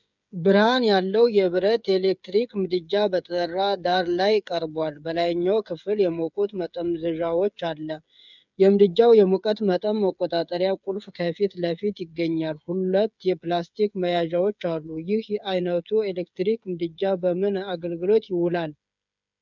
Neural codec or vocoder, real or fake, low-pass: codec, 16 kHz, 8 kbps, FreqCodec, smaller model; fake; 7.2 kHz